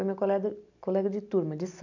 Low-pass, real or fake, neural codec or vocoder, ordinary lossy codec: 7.2 kHz; real; none; none